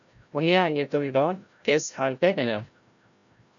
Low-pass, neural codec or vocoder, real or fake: 7.2 kHz; codec, 16 kHz, 0.5 kbps, FreqCodec, larger model; fake